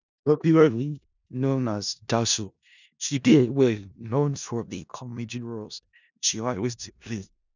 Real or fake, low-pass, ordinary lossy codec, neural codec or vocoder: fake; 7.2 kHz; none; codec, 16 kHz in and 24 kHz out, 0.4 kbps, LongCat-Audio-Codec, four codebook decoder